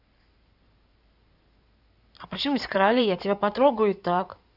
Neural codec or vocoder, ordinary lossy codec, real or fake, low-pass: codec, 16 kHz in and 24 kHz out, 2.2 kbps, FireRedTTS-2 codec; none; fake; 5.4 kHz